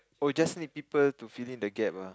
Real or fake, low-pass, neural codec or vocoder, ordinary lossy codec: real; none; none; none